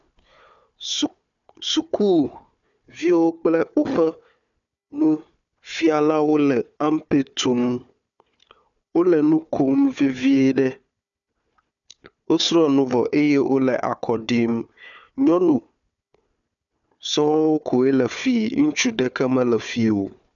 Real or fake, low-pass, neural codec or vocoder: fake; 7.2 kHz; codec, 16 kHz, 4 kbps, FunCodec, trained on Chinese and English, 50 frames a second